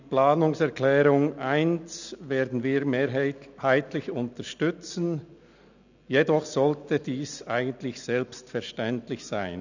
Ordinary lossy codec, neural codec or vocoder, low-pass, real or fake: none; none; 7.2 kHz; real